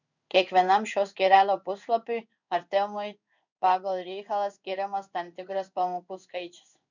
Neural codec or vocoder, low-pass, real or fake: codec, 16 kHz in and 24 kHz out, 1 kbps, XY-Tokenizer; 7.2 kHz; fake